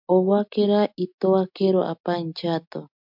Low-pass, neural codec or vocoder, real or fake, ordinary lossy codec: 5.4 kHz; none; real; MP3, 48 kbps